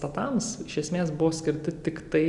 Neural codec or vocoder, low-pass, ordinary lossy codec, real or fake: none; 10.8 kHz; Opus, 64 kbps; real